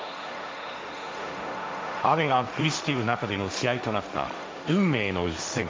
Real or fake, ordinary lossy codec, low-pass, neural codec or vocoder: fake; none; none; codec, 16 kHz, 1.1 kbps, Voila-Tokenizer